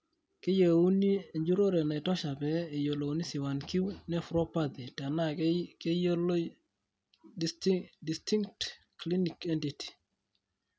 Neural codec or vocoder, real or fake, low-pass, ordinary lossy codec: none; real; none; none